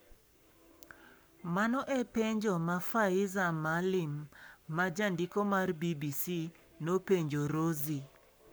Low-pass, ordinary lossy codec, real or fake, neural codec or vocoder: none; none; fake; codec, 44.1 kHz, 7.8 kbps, Pupu-Codec